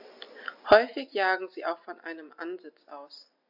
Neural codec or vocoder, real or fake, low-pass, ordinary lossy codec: none; real; 5.4 kHz; AAC, 48 kbps